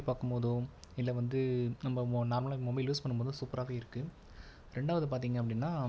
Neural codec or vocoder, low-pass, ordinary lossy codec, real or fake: none; none; none; real